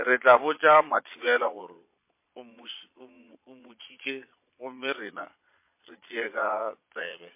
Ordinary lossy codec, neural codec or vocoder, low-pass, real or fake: MP3, 24 kbps; vocoder, 22.05 kHz, 80 mel bands, Vocos; 3.6 kHz; fake